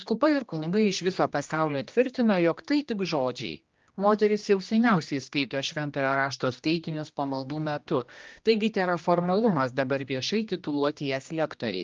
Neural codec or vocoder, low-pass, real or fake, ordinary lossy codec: codec, 16 kHz, 1 kbps, X-Codec, HuBERT features, trained on general audio; 7.2 kHz; fake; Opus, 24 kbps